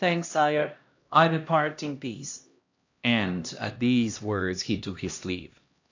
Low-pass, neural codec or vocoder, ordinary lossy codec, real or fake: 7.2 kHz; codec, 16 kHz, 1 kbps, X-Codec, HuBERT features, trained on LibriSpeech; AAC, 48 kbps; fake